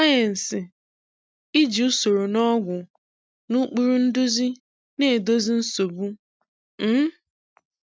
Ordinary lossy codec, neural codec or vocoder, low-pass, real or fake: none; none; none; real